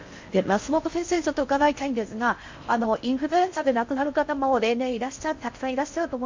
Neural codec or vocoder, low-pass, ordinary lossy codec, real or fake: codec, 16 kHz in and 24 kHz out, 0.6 kbps, FocalCodec, streaming, 4096 codes; 7.2 kHz; MP3, 48 kbps; fake